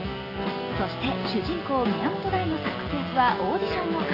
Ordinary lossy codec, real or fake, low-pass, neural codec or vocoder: AAC, 24 kbps; real; 5.4 kHz; none